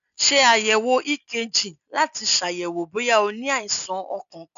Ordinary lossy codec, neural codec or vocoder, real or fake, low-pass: none; none; real; 7.2 kHz